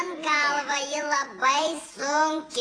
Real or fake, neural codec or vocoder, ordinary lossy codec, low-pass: fake; vocoder, 48 kHz, 128 mel bands, Vocos; AAC, 32 kbps; 9.9 kHz